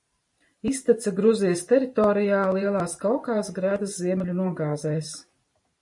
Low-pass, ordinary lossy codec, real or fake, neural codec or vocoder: 10.8 kHz; MP3, 48 kbps; fake; vocoder, 44.1 kHz, 128 mel bands every 512 samples, BigVGAN v2